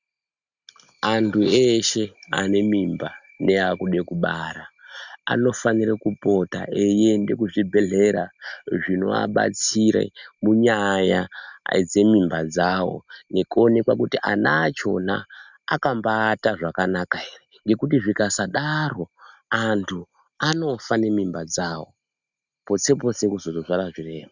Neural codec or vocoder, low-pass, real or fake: none; 7.2 kHz; real